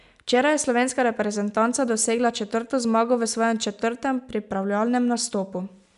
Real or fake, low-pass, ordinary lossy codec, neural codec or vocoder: real; 10.8 kHz; none; none